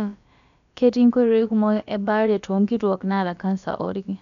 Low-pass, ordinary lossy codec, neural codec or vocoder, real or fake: 7.2 kHz; none; codec, 16 kHz, about 1 kbps, DyCAST, with the encoder's durations; fake